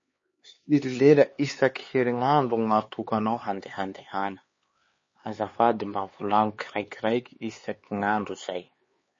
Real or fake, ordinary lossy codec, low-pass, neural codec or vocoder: fake; MP3, 32 kbps; 7.2 kHz; codec, 16 kHz, 4 kbps, X-Codec, HuBERT features, trained on LibriSpeech